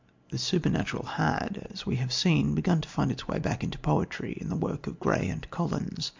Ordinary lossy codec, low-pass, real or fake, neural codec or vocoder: MP3, 64 kbps; 7.2 kHz; real; none